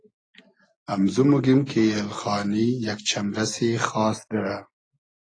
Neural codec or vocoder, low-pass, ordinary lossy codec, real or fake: none; 9.9 kHz; AAC, 32 kbps; real